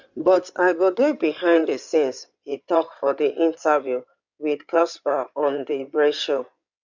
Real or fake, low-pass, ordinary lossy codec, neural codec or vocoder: fake; 7.2 kHz; none; codec, 16 kHz in and 24 kHz out, 2.2 kbps, FireRedTTS-2 codec